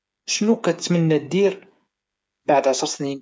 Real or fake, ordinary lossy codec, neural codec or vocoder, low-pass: fake; none; codec, 16 kHz, 8 kbps, FreqCodec, smaller model; none